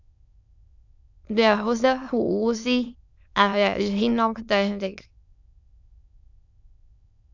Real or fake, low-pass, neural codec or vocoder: fake; 7.2 kHz; autoencoder, 22.05 kHz, a latent of 192 numbers a frame, VITS, trained on many speakers